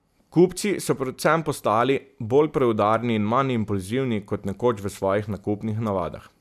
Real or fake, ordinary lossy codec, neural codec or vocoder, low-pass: real; none; none; 14.4 kHz